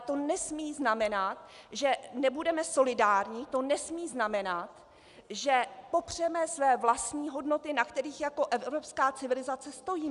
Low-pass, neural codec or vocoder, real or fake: 10.8 kHz; none; real